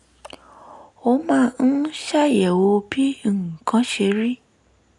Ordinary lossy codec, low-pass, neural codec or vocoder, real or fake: none; 10.8 kHz; none; real